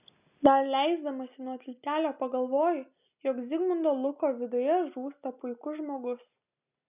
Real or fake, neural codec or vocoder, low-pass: real; none; 3.6 kHz